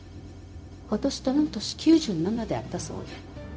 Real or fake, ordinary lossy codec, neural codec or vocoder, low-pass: fake; none; codec, 16 kHz, 0.4 kbps, LongCat-Audio-Codec; none